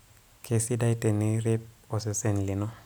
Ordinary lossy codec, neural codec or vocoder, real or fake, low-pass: none; none; real; none